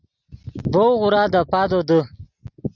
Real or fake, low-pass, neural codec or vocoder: real; 7.2 kHz; none